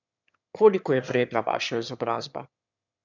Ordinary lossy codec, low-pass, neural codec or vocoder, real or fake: none; 7.2 kHz; autoencoder, 22.05 kHz, a latent of 192 numbers a frame, VITS, trained on one speaker; fake